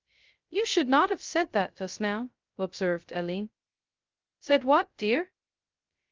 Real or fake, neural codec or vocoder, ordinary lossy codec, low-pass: fake; codec, 16 kHz, 0.2 kbps, FocalCodec; Opus, 24 kbps; 7.2 kHz